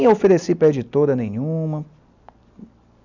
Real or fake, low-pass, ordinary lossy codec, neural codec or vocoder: real; 7.2 kHz; none; none